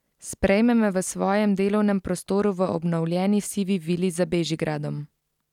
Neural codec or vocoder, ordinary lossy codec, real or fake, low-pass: none; none; real; 19.8 kHz